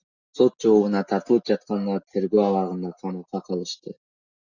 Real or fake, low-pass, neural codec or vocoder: real; 7.2 kHz; none